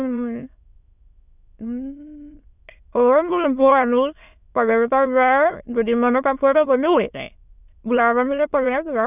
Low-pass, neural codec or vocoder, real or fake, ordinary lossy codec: 3.6 kHz; autoencoder, 22.05 kHz, a latent of 192 numbers a frame, VITS, trained on many speakers; fake; none